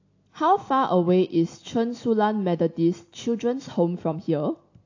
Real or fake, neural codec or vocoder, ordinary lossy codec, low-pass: real; none; AAC, 48 kbps; 7.2 kHz